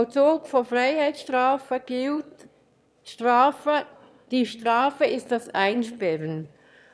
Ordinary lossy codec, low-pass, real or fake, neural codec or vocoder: none; none; fake; autoencoder, 22.05 kHz, a latent of 192 numbers a frame, VITS, trained on one speaker